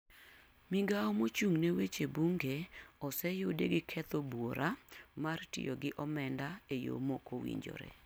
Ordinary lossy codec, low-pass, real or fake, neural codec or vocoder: none; none; real; none